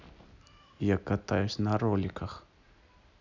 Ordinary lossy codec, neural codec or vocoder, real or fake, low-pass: none; none; real; 7.2 kHz